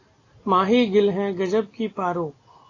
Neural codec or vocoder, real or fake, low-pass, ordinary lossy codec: none; real; 7.2 kHz; AAC, 32 kbps